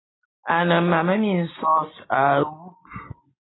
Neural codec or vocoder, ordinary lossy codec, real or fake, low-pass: none; AAC, 16 kbps; real; 7.2 kHz